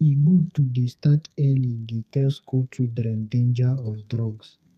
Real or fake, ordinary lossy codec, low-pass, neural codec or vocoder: fake; none; 14.4 kHz; codec, 32 kHz, 1.9 kbps, SNAC